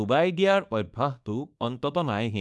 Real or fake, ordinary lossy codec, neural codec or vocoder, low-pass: fake; none; codec, 24 kHz, 0.9 kbps, WavTokenizer, small release; none